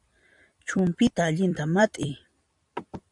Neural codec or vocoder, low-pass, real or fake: vocoder, 44.1 kHz, 128 mel bands every 256 samples, BigVGAN v2; 10.8 kHz; fake